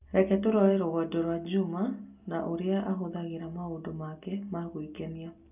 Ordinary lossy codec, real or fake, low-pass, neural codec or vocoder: none; real; 3.6 kHz; none